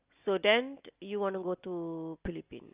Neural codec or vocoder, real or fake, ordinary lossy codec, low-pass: none; real; Opus, 24 kbps; 3.6 kHz